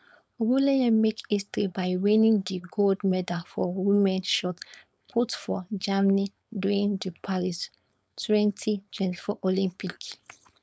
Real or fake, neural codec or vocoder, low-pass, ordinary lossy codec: fake; codec, 16 kHz, 4.8 kbps, FACodec; none; none